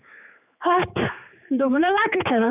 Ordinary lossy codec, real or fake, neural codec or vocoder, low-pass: none; fake; codec, 16 kHz, 4 kbps, X-Codec, HuBERT features, trained on general audio; 3.6 kHz